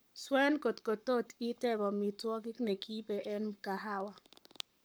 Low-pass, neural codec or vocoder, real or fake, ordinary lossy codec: none; codec, 44.1 kHz, 7.8 kbps, Pupu-Codec; fake; none